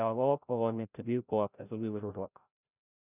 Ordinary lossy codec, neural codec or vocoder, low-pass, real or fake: none; codec, 16 kHz, 0.5 kbps, FreqCodec, larger model; 3.6 kHz; fake